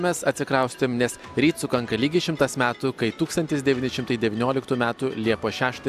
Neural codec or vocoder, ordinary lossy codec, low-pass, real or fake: none; AAC, 96 kbps; 14.4 kHz; real